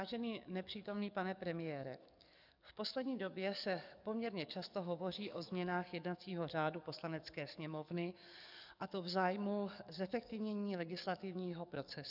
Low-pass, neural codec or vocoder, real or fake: 5.4 kHz; codec, 44.1 kHz, 7.8 kbps, DAC; fake